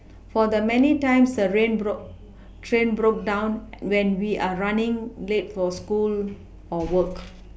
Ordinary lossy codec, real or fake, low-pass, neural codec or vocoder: none; real; none; none